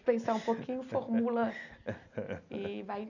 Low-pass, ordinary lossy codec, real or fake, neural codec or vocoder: 7.2 kHz; none; real; none